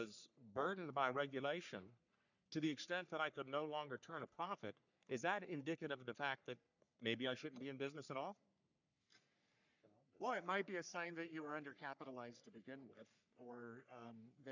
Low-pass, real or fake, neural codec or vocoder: 7.2 kHz; fake; codec, 44.1 kHz, 3.4 kbps, Pupu-Codec